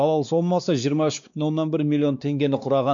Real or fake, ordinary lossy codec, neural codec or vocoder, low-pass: fake; none; codec, 16 kHz, 2 kbps, X-Codec, WavLM features, trained on Multilingual LibriSpeech; 7.2 kHz